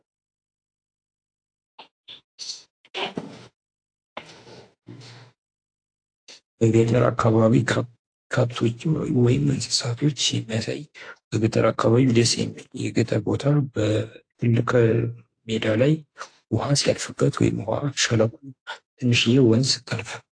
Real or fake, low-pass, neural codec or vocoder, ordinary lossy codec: fake; 9.9 kHz; autoencoder, 48 kHz, 32 numbers a frame, DAC-VAE, trained on Japanese speech; AAC, 48 kbps